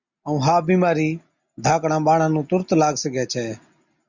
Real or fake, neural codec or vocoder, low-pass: real; none; 7.2 kHz